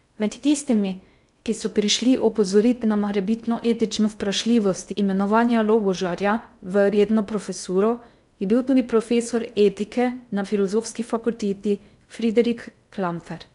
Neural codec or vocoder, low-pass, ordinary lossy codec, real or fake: codec, 16 kHz in and 24 kHz out, 0.6 kbps, FocalCodec, streaming, 2048 codes; 10.8 kHz; none; fake